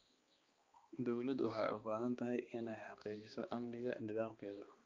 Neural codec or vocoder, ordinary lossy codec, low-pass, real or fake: codec, 16 kHz, 2 kbps, X-Codec, HuBERT features, trained on balanced general audio; Opus, 24 kbps; 7.2 kHz; fake